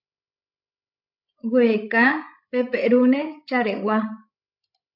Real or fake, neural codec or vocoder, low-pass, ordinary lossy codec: fake; codec, 16 kHz, 16 kbps, FreqCodec, larger model; 5.4 kHz; AAC, 48 kbps